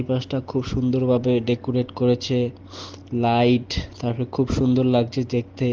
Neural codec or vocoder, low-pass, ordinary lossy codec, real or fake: none; 7.2 kHz; Opus, 16 kbps; real